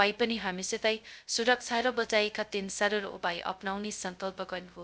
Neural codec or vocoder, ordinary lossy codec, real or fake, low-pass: codec, 16 kHz, 0.2 kbps, FocalCodec; none; fake; none